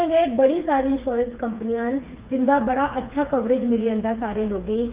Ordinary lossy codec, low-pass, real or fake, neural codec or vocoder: Opus, 24 kbps; 3.6 kHz; fake; codec, 16 kHz, 4 kbps, FreqCodec, smaller model